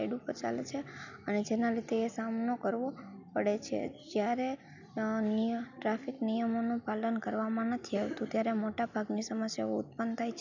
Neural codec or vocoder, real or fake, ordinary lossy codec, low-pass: none; real; none; 7.2 kHz